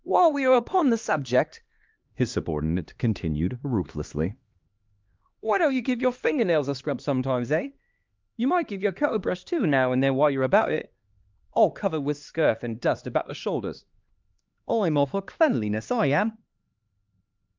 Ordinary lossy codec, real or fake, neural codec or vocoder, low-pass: Opus, 32 kbps; fake; codec, 16 kHz, 2 kbps, X-Codec, HuBERT features, trained on LibriSpeech; 7.2 kHz